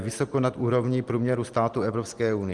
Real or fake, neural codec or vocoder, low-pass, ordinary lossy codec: real; none; 10.8 kHz; Opus, 24 kbps